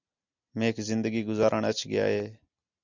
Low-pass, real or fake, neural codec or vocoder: 7.2 kHz; real; none